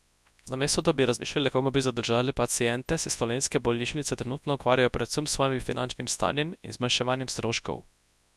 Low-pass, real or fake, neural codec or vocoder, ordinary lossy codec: none; fake; codec, 24 kHz, 0.9 kbps, WavTokenizer, large speech release; none